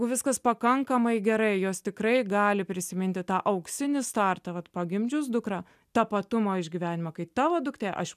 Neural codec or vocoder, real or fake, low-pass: none; real; 14.4 kHz